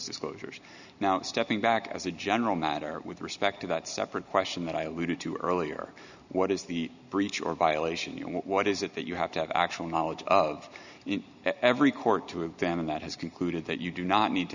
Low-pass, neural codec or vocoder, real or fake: 7.2 kHz; none; real